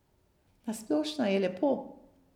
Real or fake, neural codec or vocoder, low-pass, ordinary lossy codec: real; none; 19.8 kHz; none